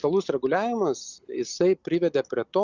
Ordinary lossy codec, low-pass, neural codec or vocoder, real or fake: Opus, 64 kbps; 7.2 kHz; none; real